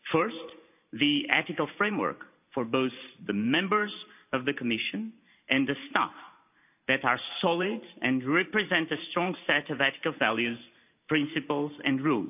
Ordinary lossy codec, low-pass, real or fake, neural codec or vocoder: none; 3.6 kHz; fake; vocoder, 44.1 kHz, 128 mel bands every 512 samples, BigVGAN v2